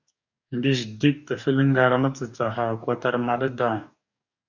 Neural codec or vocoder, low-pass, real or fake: codec, 44.1 kHz, 2.6 kbps, DAC; 7.2 kHz; fake